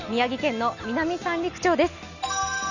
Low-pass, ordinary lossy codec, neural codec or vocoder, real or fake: 7.2 kHz; none; none; real